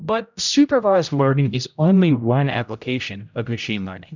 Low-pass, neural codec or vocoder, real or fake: 7.2 kHz; codec, 16 kHz, 0.5 kbps, X-Codec, HuBERT features, trained on general audio; fake